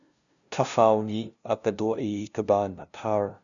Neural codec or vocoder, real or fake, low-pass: codec, 16 kHz, 0.5 kbps, FunCodec, trained on LibriTTS, 25 frames a second; fake; 7.2 kHz